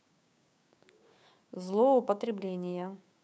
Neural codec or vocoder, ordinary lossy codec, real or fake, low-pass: codec, 16 kHz, 6 kbps, DAC; none; fake; none